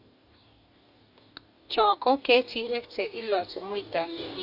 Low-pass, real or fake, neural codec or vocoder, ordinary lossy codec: 5.4 kHz; fake; codec, 44.1 kHz, 2.6 kbps, DAC; none